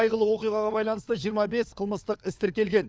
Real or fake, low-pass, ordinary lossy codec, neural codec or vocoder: fake; none; none; codec, 16 kHz, 4 kbps, FunCodec, trained on LibriTTS, 50 frames a second